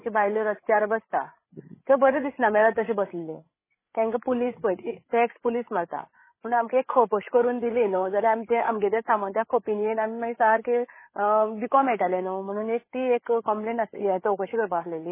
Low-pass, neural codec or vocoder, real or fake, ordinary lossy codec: 3.6 kHz; codec, 16 kHz, 8 kbps, FreqCodec, larger model; fake; MP3, 16 kbps